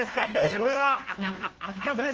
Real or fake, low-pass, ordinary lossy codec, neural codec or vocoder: fake; 7.2 kHz; Opus, 16 kbps; codec, 16 kHz, 1 kbps, FunCodec, trained on LibriTTS, 50 frames a second